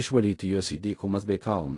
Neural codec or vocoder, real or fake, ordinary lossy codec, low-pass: codec, 16 kHz in and 24 kHz out, 0.4 kbps, LongCat-Audio-Codec, fine tuned four codebook decoder; fake; AAC, 48 kbps; 10.8 kHz